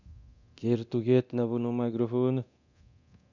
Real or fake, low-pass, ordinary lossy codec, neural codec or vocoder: fake; 7.2 kHz; none; codec, 24 kHz, 0.9 kbps, DualCodec